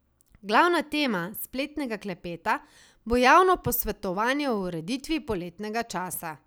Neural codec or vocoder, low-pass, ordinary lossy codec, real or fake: none; none; none; real